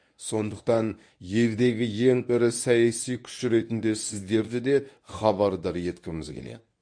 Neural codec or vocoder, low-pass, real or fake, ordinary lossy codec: codec, 24 kHz, 0.9 kbps, WavTokenizer, medium speech release version 1; 9.9 kHz; fake; AAC, 64 kbps